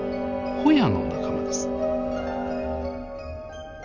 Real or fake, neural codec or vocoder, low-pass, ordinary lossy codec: real; none; 7.2 kHz; none